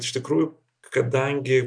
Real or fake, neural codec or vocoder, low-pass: real; none; 9.9 kHz